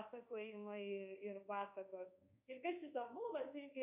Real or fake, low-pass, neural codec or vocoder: fake; 3.6 kHz; codec, 24 kHz, 1.2 kbps, DualCodec